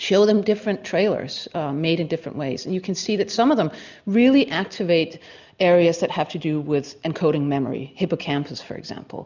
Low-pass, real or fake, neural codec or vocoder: 7.2 kHz; real; none